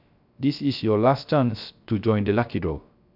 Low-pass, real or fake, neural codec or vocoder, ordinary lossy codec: 5.4 kHz; fake; codec, 16 kHz, 0.3 kbps, FocalCodec; none